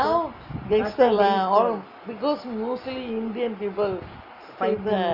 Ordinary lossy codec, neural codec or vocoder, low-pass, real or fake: none; none; 5.4 kHz; real